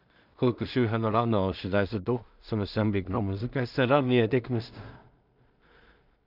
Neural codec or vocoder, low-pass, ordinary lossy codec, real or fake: codec, 16 kHz in and 24 kHz out, 0.4 kbps, LongCat-Audio-Codec, two codebook decoder; 5.4 kHz; none; fake